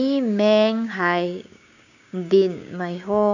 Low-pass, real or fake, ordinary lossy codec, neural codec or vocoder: 7.2 kHz; fake; none; codec, 16 kHz, 6 kbps, DAC